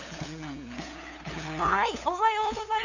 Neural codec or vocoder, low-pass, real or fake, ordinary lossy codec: codec, 16 kHz, 8 kbps, FunCodec, trained on LibriTTS, 25 frames a second; 7.2 kHz; fake; none